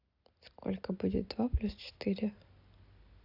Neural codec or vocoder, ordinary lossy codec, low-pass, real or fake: none; AAC, 48 kbps; 5.4 kHz; real